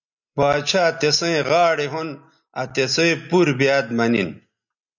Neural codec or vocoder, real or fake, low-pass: none; real; 7.2 kHz